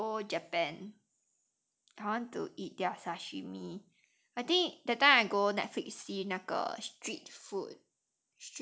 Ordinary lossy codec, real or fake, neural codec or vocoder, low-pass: none; real; none; none